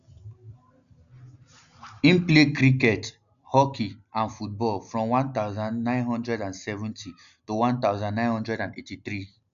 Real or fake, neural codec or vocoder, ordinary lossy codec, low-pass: real; none; none; 7.2 kHz